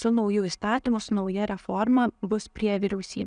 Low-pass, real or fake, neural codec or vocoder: 9.9 kHz; real; none